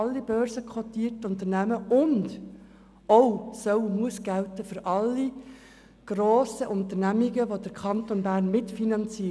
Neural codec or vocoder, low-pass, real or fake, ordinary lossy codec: none; none; real; none